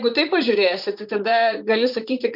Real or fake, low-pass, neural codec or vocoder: fake; 5.4 kHz; vocoder, 44.1 kHz, 128 mel bands, Pupu-Vocoder